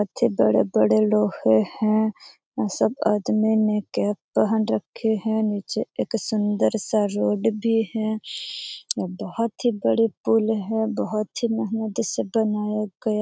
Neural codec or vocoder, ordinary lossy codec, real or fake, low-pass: none; none; real; none